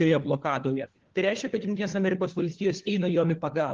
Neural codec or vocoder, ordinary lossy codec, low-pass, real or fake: codec, 16 kHz, 4 kbps, FunCodec, trained on LibriTTS, 50 frames a second; Opus, 16 kbps; 7.2 kHz; fake